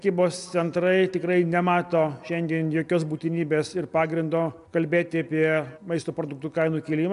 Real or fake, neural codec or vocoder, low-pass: real; none; 10.8 kHz